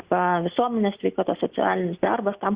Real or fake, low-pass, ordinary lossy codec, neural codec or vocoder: real; 3.6 kHz; Opus, 64 kbps; none